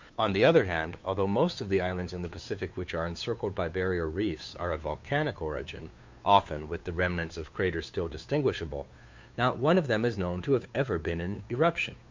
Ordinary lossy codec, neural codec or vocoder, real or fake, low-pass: MP3, 64 kbps; codec, 16 kHz, 2 kbps, FunCodec, trained on Chinese and English, 25 frames a second; fake; 7.2 kHz